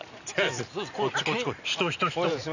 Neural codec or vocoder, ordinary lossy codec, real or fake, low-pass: none; none; real; 7.2 kHz